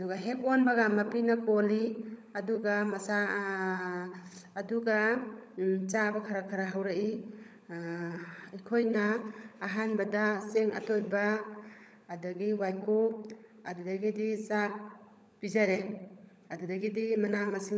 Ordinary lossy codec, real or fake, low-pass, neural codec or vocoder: none; fake; none; codec, 16 kHz, 16 kbps, FunCodec, trained on LibriTTS, 50 frames a second